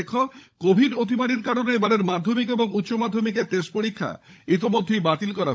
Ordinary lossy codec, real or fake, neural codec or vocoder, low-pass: none; fake; codec, 16 kHz, 16 kbps, FunCodec, trained on LibriTTS, 50 frames a second; none